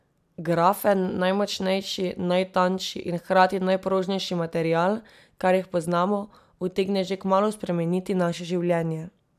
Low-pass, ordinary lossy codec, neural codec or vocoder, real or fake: 14.4 kHz; none; none; real